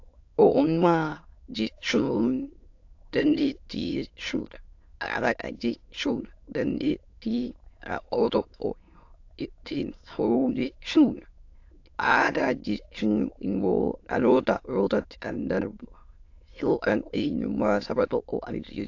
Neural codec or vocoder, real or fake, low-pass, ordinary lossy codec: autoencoder, 22.05 kHz, a latent of 192 numbers a frame, VITS, trained on many speakers; fake; 7.2 kHz; AAC, 48 kbps